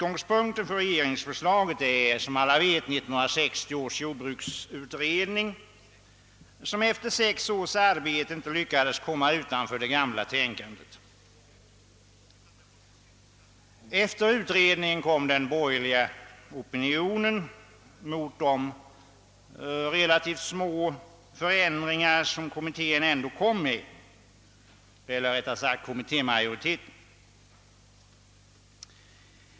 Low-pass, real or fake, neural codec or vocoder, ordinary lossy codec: none; real; none; none